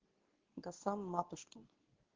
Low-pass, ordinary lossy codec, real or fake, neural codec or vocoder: 7.2 kHz; Opus, 16 kbps; fake; codec, 24 kHz, 0.9 kbps, WavTokenizer, medium speech release version 2